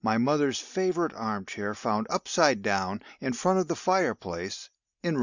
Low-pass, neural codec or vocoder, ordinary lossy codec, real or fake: 7.2 kHz; none; Opus, 64 kbps; real